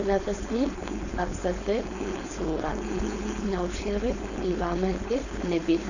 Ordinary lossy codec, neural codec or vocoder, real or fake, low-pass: none; codec, 16 kHz, 4.8 kbps, FACodec; fake; 7.2 kHz